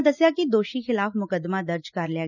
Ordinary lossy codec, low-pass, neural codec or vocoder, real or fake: none; 7.2 kHz; none; real